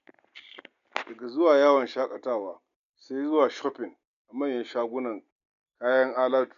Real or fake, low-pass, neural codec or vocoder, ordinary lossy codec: real; 7.2 kHz; none; none